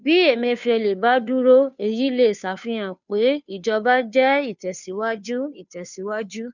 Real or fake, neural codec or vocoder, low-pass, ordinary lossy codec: fake; codec, 16 kHz, 2 kbps, FunCodec, trained on Chinese and English, 25 frames a second; 7.2 kHz; none